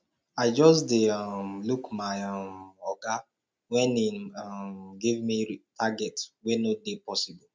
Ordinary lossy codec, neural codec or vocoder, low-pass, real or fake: none; none; none; real